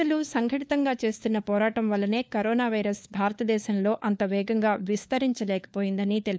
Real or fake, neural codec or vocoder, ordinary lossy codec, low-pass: fake; codec, 16 kHz, 4 kbps, FunCodec, trained on LibriTTS, 50 frames a second; none; none